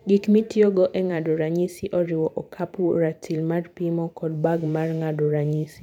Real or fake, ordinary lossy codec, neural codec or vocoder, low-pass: fake; none; vocoder, 44.1 kHz, 128 mel bands every 512 samples, BigVGAN v2; 19.8 kHz